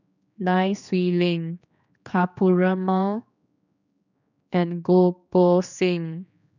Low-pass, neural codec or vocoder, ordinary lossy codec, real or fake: 7.2 kHz; codec, 16 kHz, 2 kbps, X-Codec, HuBERT features, trained on general audio; none; fake